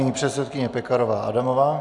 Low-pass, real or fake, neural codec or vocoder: 10.8 kHz; real; none